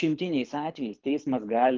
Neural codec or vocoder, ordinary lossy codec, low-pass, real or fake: codec, 24 kHz, 6 kbps, HILCodec; Opus, 24 kbps; 7.2 kHz; fake